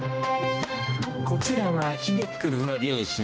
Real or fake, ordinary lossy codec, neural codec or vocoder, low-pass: fake; none; codec, 16 kHz, 1 kbps, X-Codec, HuBERT features, trained on general audio; none